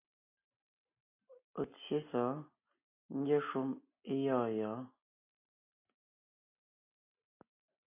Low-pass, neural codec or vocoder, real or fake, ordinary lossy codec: 3.6 kHz; none; real; MP3, 24 kbps